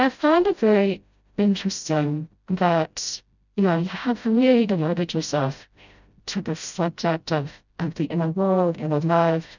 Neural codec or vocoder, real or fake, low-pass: codec, 16 kHz, 0.5 kbps, FreqCodec, smaller model; fake; 7.2 kHz